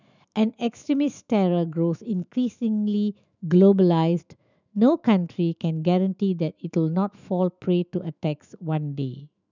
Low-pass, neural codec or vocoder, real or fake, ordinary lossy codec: 7.2 kHz; autoencoder, 48 kHz, 128 numbers a frame, DAC-VAE, trained on Japanese speech; fake; none